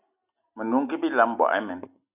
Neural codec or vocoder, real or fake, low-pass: none; real; 3.6 kHz